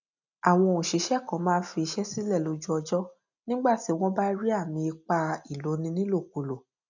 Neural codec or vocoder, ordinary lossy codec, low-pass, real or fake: none; none; 7.2 kHz; real